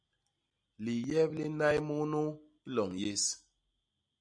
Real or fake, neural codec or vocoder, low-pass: real; none; 9.9 kHz